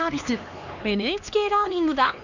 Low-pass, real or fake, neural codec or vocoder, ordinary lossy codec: 7.2 kHz; fake; codec, 16 kHz, 2 kbps, X-Codec, HuBERT features, trained on LibriSpeech; none